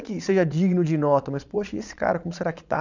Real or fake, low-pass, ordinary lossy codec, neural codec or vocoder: fake; 7.2 kHz; none; vocoder, 22.05 kHz, 80 mel bands, Vocos